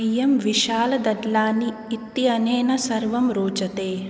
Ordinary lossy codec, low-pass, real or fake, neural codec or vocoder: none; none; real; none